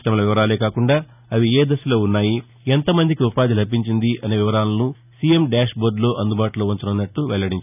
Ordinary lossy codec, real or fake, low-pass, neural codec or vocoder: none; real; 3.6 kHz; none